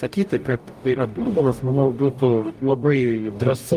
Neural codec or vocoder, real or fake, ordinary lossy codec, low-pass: codec, 44.1 kHz, 0.9 kbps, DAC; fake; Opus, 32 kbps; 14.4 kHz